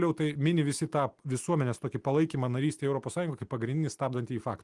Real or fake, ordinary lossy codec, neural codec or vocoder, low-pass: real; Opus, 24 kbps; none; 10.8 kHz